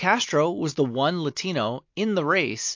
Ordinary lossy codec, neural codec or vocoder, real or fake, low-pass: MP3, 48 kbps; none; real; 7.2 kHz